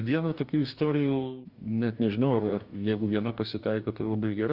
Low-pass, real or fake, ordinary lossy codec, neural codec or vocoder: 5.4 kHz; fake; Opus, 64 kbps; codec, 44.1 kHz, 2.6 kbps, DAC